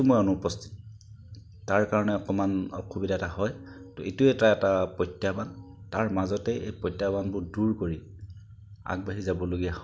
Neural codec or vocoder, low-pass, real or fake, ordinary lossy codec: none; none; real; none